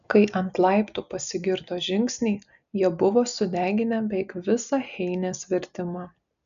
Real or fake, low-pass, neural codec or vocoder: real; 7.2 kHz; none